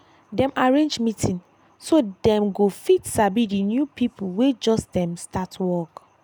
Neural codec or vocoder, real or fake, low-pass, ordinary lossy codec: none; real; none; none